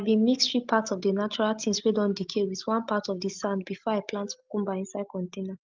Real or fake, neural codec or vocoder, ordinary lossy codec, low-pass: real; none; Opus, 32 kbps; 7.2 kHz